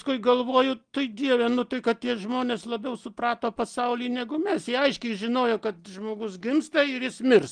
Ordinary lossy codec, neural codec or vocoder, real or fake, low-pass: Opus, 24 kbps; none; real; 9.9 kHz